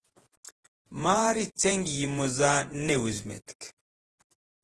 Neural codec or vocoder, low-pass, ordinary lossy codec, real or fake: vocoder, 48 kHz, 128 mel bands, Vocos; 10.8 kHz; Opus, 16 kbps; fake